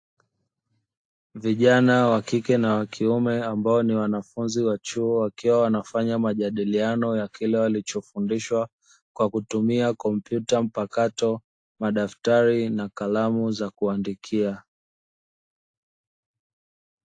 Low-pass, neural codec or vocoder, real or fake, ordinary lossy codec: 9.9 kHz; none; real; AAC, 48 kbps